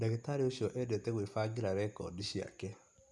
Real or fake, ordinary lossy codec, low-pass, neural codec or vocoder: real; none; none; none